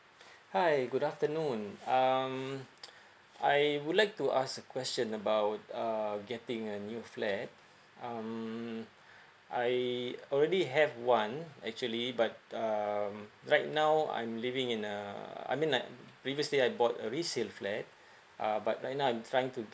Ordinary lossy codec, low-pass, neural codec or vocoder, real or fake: none; none; none; real